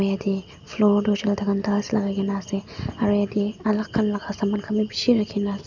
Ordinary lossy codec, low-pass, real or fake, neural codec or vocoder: none; 7.2 kHz; real; none